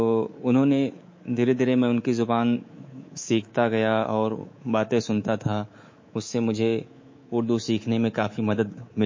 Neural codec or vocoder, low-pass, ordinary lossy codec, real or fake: codec, 24 kHz, 3.1 kbps, DualCodec; 7.2 kHz; MP3, 32 kbps; fake